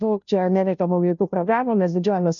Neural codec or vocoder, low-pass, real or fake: codec, 16 kHz, 0.5 kbps, FunCodec, trained on Chinese and English, 25 frames a second; 7.2 kHz; fake